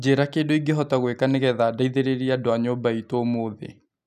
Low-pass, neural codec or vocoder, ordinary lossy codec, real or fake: 14.4 kHz; none; none; real